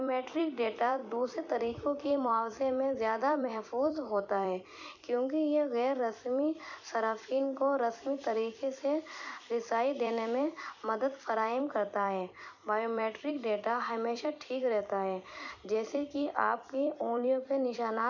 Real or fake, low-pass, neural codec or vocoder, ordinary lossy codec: fake; 7.2 kHz; vocoder, 44.1 kHz, 128 mel bands every 256 samples, BigVGAN v2; none